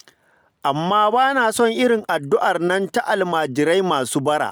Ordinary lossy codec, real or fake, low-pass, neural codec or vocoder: none; real; none; none